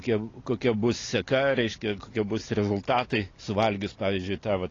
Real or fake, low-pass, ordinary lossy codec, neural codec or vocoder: real; 7.2 kHz; AAC, 32 kbps; none